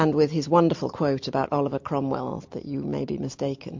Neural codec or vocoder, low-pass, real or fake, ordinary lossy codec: none; 7.2 kHz; real; MP3, 48 kbps